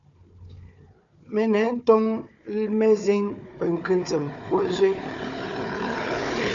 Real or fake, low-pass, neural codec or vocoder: fake; 7.2 kHz; codec, 16 kHz, 4 kbps, FunCodec, trained on Chinese and English, 50 frames a second